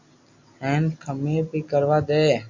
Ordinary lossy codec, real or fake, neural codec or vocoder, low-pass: AAC, 48 kbps; real; none; 7.2 kHz